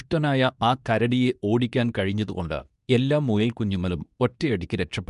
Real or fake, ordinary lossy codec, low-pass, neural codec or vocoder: fake; none; 10.8 kHz; codec, 24 kHz, 0.9 kbps, WavTokenizer, medium speech release version 2